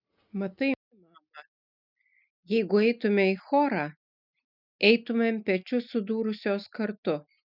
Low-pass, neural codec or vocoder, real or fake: 5.4 kHz; none; real